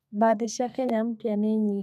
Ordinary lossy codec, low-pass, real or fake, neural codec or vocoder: none; 14.4 kHz; fake; codec, 32 kHz, 1.9 kbps, SNAC